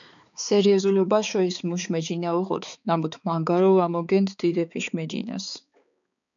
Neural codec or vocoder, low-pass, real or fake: codec, 16 kHz, 4 kbps, X-Codec, HuBERT features, trained on balanced general audio; 7.2 kHz; fake